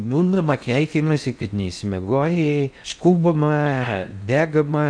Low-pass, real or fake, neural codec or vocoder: 9.9 kHz; fake; codec, 16 kHz in and 24 kHz out, 0.6 kbps, FocalCodec, streaming, 4096 codes